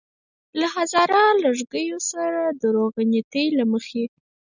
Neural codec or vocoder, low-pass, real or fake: none; 7.2 kHz; real